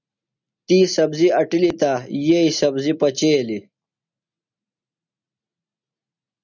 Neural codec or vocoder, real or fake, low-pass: none; real; 7.2 kHz